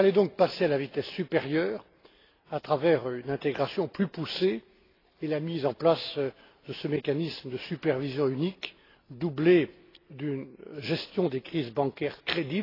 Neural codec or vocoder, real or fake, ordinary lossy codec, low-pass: none; real; AAC, 24 kbps; 5.4 kHz